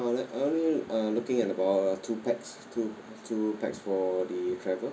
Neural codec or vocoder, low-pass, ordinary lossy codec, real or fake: none; none; none; real